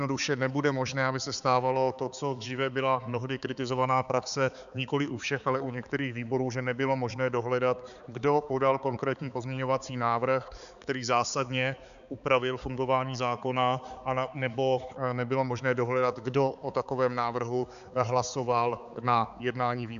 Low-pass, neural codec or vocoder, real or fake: 7.2 kHz; codec, 16 kHz, 4 kbps, X-Codec, HuBERT features, trained on balanced general audio; fake